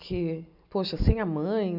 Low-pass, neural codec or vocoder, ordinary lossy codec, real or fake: 5.4 kHz; none; AAC, 48 kbps; real